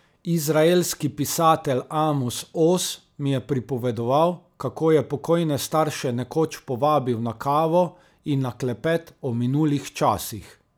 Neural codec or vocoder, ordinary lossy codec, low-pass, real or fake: none; none; none; real